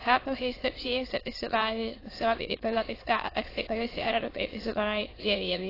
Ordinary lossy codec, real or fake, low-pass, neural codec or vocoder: AAC, 24 kbps; fake; 5.4 kHz; autoencoder, 22.05 kHz, a latent of 192 numbers a frame, VITS, trained on many speakers